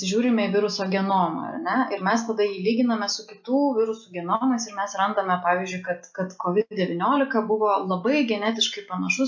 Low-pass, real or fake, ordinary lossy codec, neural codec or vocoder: 7.2 kHz; real; MP3, 48 kbps; none